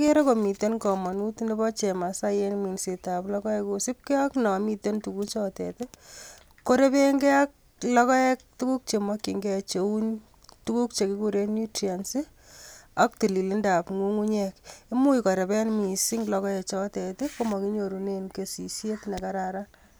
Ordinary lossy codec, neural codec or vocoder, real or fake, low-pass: none; none; real; none